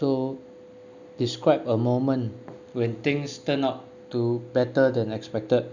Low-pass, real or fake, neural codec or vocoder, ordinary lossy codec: 7.2 kHz; real; none; none